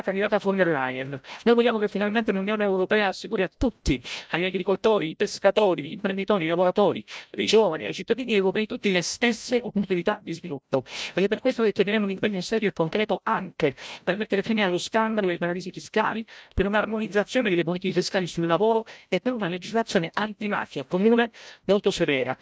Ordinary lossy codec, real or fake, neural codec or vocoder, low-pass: none; fake; codec, 16 kHz, 0.5 kbps, FreqCodec, larger model; none